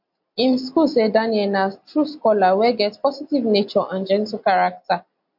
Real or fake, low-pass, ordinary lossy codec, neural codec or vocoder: real; 5.4 kHz; MP3, 48 kbps; none